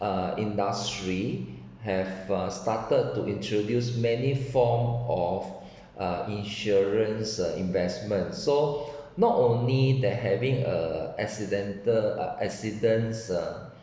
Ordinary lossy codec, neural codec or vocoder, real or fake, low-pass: none; none; real; none